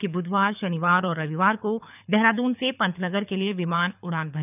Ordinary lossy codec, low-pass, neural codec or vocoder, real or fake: none; 3.6 kHz; codec, 24 kHz, 6 kbps, HILCodec; fake